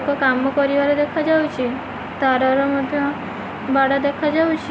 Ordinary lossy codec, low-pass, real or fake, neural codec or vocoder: none; none; real; none